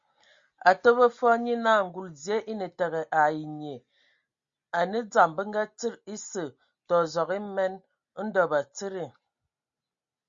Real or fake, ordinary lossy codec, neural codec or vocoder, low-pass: real; Opus, 64 kbps; none; 7.2 kHz